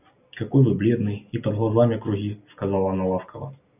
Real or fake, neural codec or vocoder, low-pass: real; none; 3.6 kHz